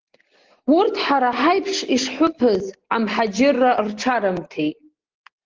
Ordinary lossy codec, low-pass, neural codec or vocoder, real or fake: Opus, 16 kbps; 7.2 kHz; none; real